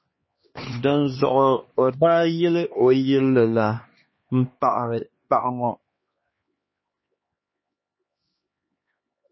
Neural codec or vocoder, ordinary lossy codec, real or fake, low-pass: codec, 16 kHz, 2 kbps, X-Codec, HuBERT features, trained on LibriSpeech; MP3, 24 kbps; fake; 7.2 kHz